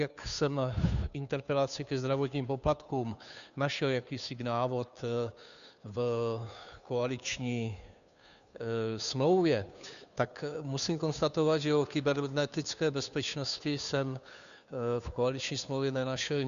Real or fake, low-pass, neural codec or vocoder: fake; 7.2 kHz; codec, 16 kHz, 2 kbps, FunCodec, trained on Chinese and English, 25 frames a second